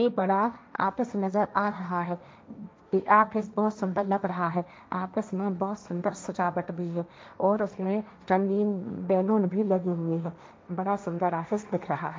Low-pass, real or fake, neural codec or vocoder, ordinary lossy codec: none; fake; codec, 16 kHz, 1.1 kbps, Voila-Tokenizer; none